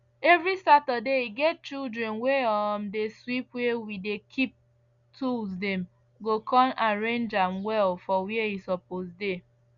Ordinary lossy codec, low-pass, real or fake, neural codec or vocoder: Opus, 64 kbps; 7.2 kHz; real; none